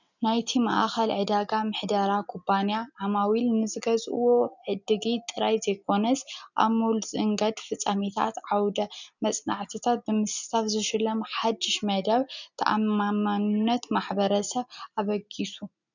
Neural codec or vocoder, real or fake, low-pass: none; real; 7.2 kHz